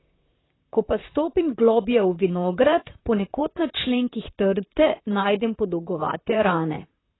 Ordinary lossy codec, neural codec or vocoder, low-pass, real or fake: AAC, 16 kbps; vocoder, 44.1 kHz, 128 mel bands, Pupu-Vocoder; 7.2 kHz; fake